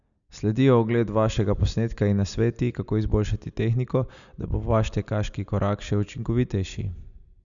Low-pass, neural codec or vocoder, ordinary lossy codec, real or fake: 7.2 kHz; none; none; real